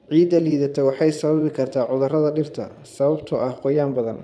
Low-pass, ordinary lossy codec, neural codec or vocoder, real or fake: none; none; vocoder, 22.05 kHz, 80 mel bands, Vocos; fake